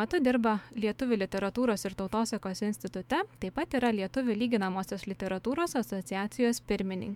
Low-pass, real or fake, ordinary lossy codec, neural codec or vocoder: 19.8 kHz; fake; MP3, 96 kbps; autoencoder, 48 kHz, 128 numbers a frame, DAC-VAE, trained on Japanese speech